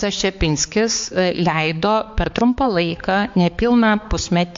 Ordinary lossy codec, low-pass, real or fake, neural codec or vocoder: MP3, 48 kbps; 7.2 kHz; fake; codec, 16 kHz, 4 kbps, X-Codec, HuBERT features, trained on balanced general audio